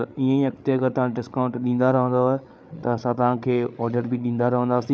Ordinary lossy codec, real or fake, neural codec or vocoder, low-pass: none; fake; codec, 16 kHz, 8 kbps, FreqCodec, larger model; none